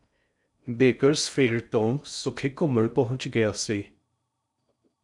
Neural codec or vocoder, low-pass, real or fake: codec, 16 kHz in and 24 kHz out, 0.6 kbps, FocalCodec, streaming, 4096 codes; 10.8 kHz; fake